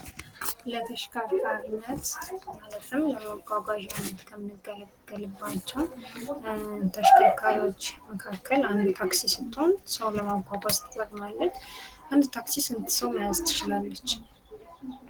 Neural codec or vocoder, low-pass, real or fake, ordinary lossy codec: none; 19.8 kHz; real; Opus, 16 kbps